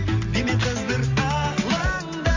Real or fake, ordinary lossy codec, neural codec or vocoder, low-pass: real; MP3, 64 kbps; none; 7.2 kHz